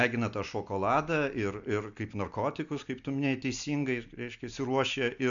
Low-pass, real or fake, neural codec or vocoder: 7.2 kHz; real; none